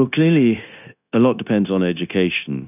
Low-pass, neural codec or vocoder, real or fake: 3.6 kHz; codec, 16 kHz, 0.9 kbps, LongCat-Audio-Codec; fake